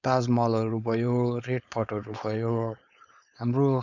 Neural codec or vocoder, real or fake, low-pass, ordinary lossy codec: codec, 16 kHz, 4.8 kbps, FACodec; fake; 7.2 kHz; none